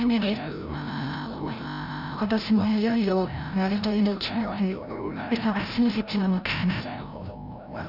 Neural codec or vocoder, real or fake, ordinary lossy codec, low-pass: codec, 16 kHz, 0.5 kbps, FreqCodec, larger model; fake; none; 5.4 kHz